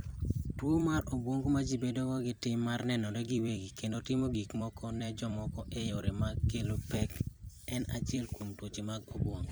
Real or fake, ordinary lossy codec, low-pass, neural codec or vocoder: fake; none; none; vocoder, 44.1 kHz, 128 mel bands every 256 samples, BigVGAN v2